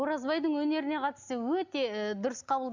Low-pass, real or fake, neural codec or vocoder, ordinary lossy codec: 7.2 kHz; real; none; none